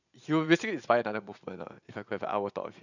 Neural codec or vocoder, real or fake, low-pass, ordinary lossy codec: vocoder, 44.1 kHz, 80 mel bands, Vocos; fake; 7.2 kHz; none